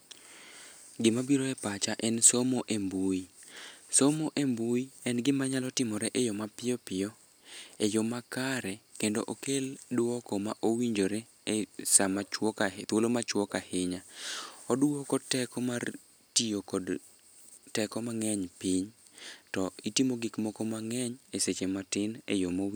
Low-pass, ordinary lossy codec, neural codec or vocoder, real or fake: none; none; none; real